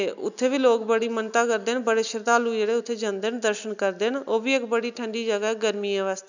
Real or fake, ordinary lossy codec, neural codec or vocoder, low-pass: real; none; none; 7.2 kHz